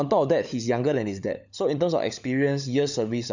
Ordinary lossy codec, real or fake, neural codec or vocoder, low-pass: none; fake; codec, 16 kHz, 16 kbps, FunCodec, trained on LibriTTS, 50 frames a second; 7.2 kHz